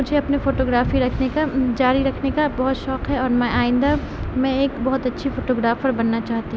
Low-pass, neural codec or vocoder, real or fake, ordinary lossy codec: none; none; real; none